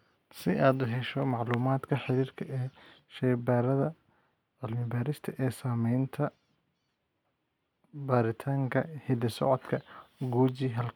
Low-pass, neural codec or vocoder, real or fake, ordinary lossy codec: 19.8 kHz; none; real; none